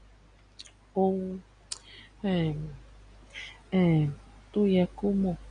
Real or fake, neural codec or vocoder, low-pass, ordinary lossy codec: real; none; 9.9 kHz; none